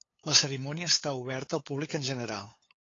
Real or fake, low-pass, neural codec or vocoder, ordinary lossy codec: fake; 7.2 kHz; codec, 16 kHz, 8 kbps, FunCodec, trained on LibriTTS, 25 frames a second; AAC, 32 kbps